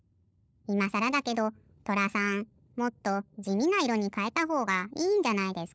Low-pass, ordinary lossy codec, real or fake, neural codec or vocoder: none; none; fake; codec, 16 kHz, 16 kbps, FunCodec, trained on LibriTTS, 50 frames a second